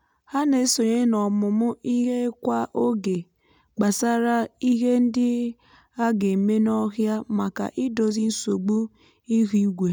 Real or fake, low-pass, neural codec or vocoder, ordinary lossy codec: real; 19.8 kHz; none; none